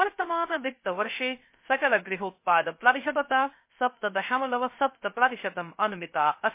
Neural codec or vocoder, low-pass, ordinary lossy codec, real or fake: codec, 16 kHz, 0.3 kbps, FocalCodec; 3.6 kHz; MP3, 24 kbps; fake